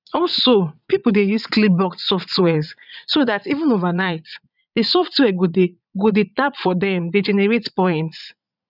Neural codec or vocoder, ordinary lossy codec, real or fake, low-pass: vocoder, 22.05 kHz, 80 mel bands, Vocos; none; fake; 5.4 kHz